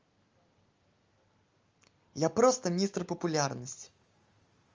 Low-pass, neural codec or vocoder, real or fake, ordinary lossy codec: 7.2 kHz; none; real; Opus, 32 kbps